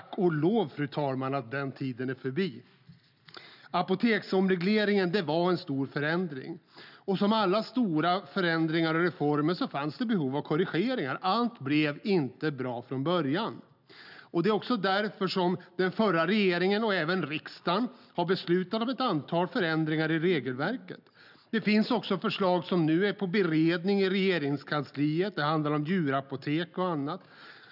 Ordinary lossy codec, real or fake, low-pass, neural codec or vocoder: none; real; 5.4 kHz; none